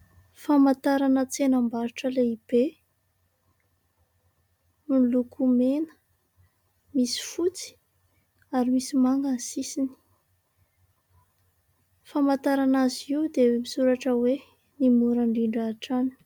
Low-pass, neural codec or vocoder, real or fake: 19.8 kHz; none; real